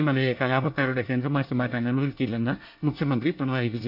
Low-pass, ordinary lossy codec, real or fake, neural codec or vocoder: 5.4 kHz; none; fake; codec, 24 kHz, 1 kbps, SNAC